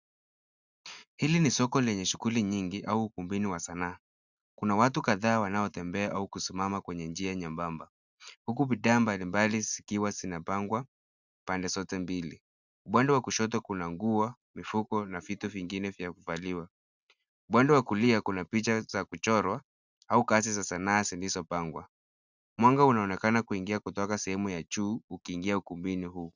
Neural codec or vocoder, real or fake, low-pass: none; real; 7.2 kHz